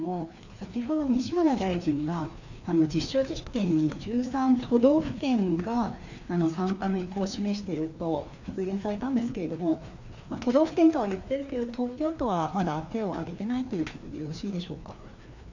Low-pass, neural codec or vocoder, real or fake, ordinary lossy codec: 7.2 kHz; codec, 16 kHz, 2 kbps, FreqCodec, larger model; fake; none